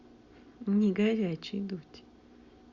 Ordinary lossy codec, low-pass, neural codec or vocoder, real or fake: Opus, 64 kbps; 7.2 kHz; none; real